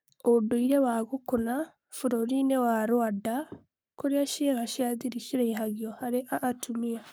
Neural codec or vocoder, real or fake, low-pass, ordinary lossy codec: codec, 44.1 kHz, 7.8 kbps, Pupu-Codec; fake; none; none